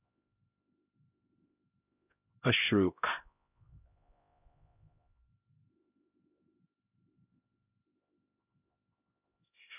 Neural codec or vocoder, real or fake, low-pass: codec, 16 kHz, 0.5 kbps, X-Codec, HuBERT features, trained on LibriSpeech; fake; 3.6 kHz